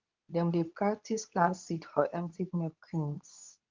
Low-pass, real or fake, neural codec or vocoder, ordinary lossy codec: 7.2 kHz; fake; codec, 24 kHz, 0.9 kbps, WavTokenizer, medium speech release version 2; Opus, 24 kbps